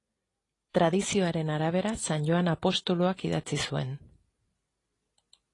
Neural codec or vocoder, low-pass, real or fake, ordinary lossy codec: none; 10.8 kHz; real; AAC, 32 kbps